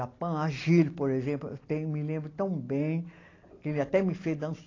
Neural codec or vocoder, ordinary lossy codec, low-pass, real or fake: none; AAC, 32 kbps; 7.2 kHz; real